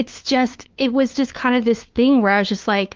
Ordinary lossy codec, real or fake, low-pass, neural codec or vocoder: Opus, 32 kbps; fake; 7.2 kHz; codec, 16 kHz, 2 kbps, FunCodec, trained on LibriTTS, 25 frames a second